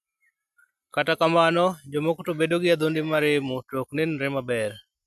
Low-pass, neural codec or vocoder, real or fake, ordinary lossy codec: 14.4 kHz; none; real; none